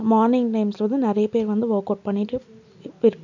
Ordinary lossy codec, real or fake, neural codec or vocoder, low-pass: none; real; none; 7.2 kHz